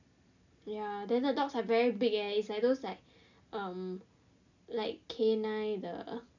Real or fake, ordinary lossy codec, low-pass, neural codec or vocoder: real; none; 7.2 kHz; none